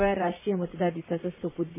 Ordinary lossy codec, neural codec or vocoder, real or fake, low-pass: MP3, 16 kbps; vocoder, 44.1 kHz, 128 mel bands, Pupu-Vocoder; fake; 3.6 kHz